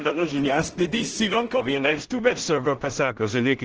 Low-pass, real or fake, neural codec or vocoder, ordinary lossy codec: 7.2 kHz; fake; codec, 16 kHz in and 24 kHz out, 0.4 kbps, LongCat-Audio-Codec, two codebook decoder; Opus, 16 kbps